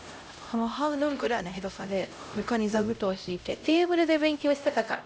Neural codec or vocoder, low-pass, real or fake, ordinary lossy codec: codec, 16 kHz, 0.5 kbps, X-Codec, HuBERT features, trained on LibriSpeech; none; fake; none